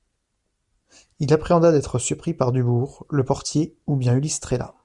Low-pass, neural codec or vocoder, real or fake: 10.8 kHz; none; real